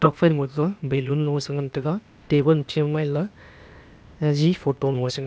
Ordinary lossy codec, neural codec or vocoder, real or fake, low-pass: none; codec, 16 kHz, 0.8 kbps, ZipCodec; fake; none